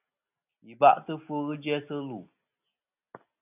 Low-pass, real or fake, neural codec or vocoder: 3.6 kHz; real; none